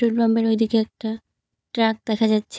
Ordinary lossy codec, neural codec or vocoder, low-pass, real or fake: none; codec, 16 kHz, 4 kbps, FunCodec, trained on Chinese and English, 50 frames a second; none; fake